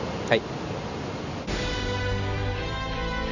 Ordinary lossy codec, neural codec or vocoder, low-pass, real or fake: none; none; 7.2 kHz; real